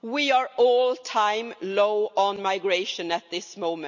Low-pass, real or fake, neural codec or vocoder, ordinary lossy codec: 7.2 kHz; real; none; none